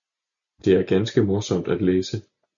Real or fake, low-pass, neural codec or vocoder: real; 7.2 kHz; none